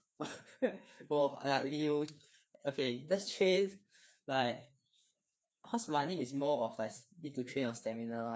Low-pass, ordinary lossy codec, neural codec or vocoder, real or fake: none; none; codec, 16 kHz, 2 kbps, FreqCodec, larger model; fake